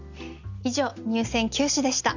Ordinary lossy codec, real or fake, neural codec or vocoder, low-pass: none; real; none; 7.2 kHz